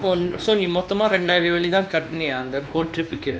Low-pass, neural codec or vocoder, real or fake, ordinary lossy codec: none; codec, 16 kHz, 2 kbps, X-Codec, WavLM features, trained on Multilingual LibriSpeech; fake; none